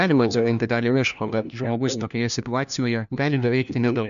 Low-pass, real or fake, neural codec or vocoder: 7.2 kHz; fake; codec, 16 kHz, 1 kbps, FunCodec, trained on LibriTTS, 50 frames a second